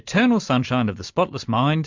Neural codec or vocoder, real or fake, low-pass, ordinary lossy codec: none; real; 7.2 kHz; MP3, 64 kbps